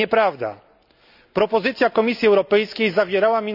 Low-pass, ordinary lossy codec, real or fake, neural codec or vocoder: 5.4 kHz; none; real; none